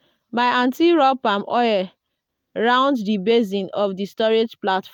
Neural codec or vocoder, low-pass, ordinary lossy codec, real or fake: none; 19.8 kHz; none; real